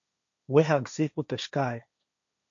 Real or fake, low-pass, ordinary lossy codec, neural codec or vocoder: fake; 7.2 kHz; MP3, 64 kbps; codec, 16 kHz, 1.1 kbps, Voila-Tokenizer